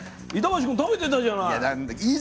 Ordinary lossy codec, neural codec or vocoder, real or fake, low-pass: none; none; real; none